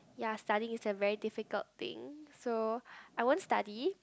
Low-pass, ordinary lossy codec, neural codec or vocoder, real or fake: none; none; none; real